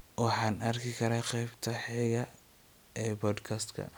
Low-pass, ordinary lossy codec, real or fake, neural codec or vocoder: none; none; real; none